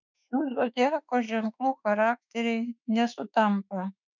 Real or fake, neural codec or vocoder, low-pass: fake; autoencoder, 48 kHz, 32 numbers a frame, DAC-VAE, trained on Japanese speech; 7.2 kHz